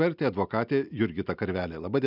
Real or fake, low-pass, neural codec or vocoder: real; 5.4 kHz; none